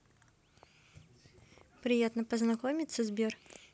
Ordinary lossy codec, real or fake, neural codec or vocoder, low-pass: none; real; none; none